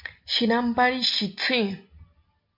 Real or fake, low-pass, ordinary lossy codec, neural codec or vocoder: real; 5.4 kHz; MP3, 32 kbps; none